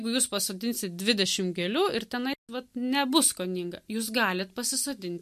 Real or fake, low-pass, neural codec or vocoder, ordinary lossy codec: real; 14.4 kHz; none; MP3, 64 kbps